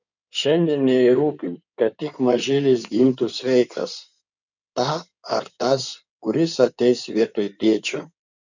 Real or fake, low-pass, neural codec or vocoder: fake; 7.2 kHz; codec, 16 kHz in and 24 kHz out, 2.2 kbps, FireRedTTS-2 codec